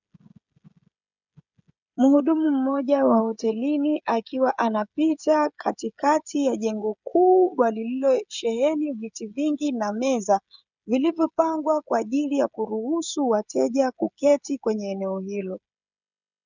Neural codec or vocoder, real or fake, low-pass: codec, 16 kHz, 16 kbps, FreqCodec, smaller model; fake; 7.2 kHz